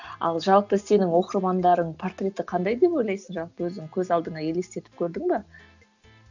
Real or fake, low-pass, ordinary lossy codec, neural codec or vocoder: real; 7.2 kHz; none; none